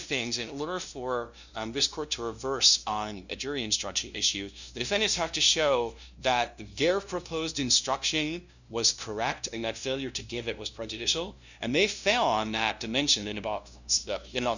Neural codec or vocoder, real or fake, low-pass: codec, 16 kHz, 0.5 kbps, FunCodec, trained on LibriTTS, 25 frames a second; fake; 7.2 kHz